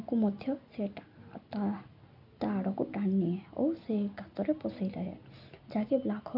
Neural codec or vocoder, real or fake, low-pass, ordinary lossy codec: none; real; 5.4 kHz; none